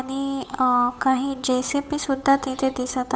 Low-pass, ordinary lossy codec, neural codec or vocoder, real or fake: none; none; codec, 16 kHz, 8 kbps, FunCodec, trained on Chinese and English, 25 frames a second; fake